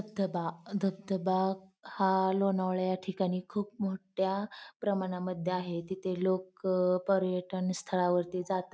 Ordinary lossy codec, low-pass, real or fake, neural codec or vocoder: none; none; real; none